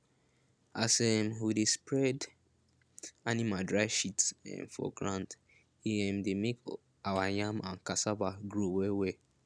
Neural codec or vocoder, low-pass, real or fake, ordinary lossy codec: none; none; real; none